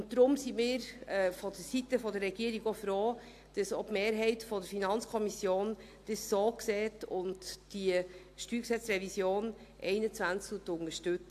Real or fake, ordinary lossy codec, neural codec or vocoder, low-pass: real; AAC, 64 kbps; none; 14.4 kHz